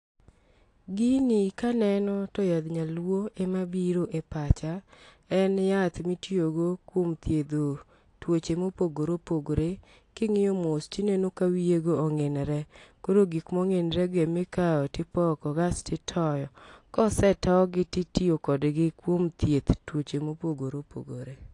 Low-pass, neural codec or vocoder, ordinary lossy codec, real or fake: 10.8 kHz; none; AAC, 48 kbps; real